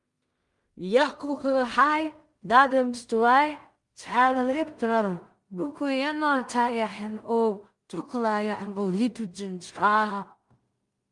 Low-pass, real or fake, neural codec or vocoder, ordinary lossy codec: 10.8 kHz; fake; codec, 16 kHz in and 24 kHz out, 0.4 kbps, LongCat-Audio-Codec, two codebook decoder; Opus, 32 kbps